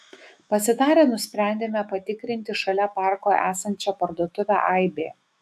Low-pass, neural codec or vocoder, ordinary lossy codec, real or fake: 14.4 kHz; autoencoder, 48 kHz, 128 numbers a frame, DAC-VAE, trained on Japanese speech; AAC, 96 kbps; fake